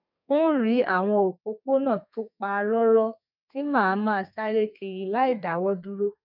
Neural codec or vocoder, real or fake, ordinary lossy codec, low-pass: codec, 32 kHz, 1.9 kbps, SNAC; fake; none; 5.4 kHz